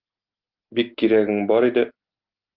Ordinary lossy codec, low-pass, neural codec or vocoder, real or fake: Opus, 24 kbps; 5.4 kHz; none; real